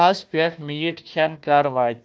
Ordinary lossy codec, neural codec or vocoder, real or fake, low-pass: none; codec, 16 kHz, 1 kbps, FunCodec, trained on Chinese and English, 50 frames a second; fake; none